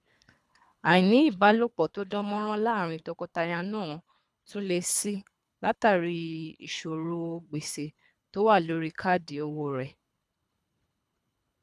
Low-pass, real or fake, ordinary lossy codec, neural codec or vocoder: none; fake; none; codec, 24 kHz, 3 kbps, HILCodec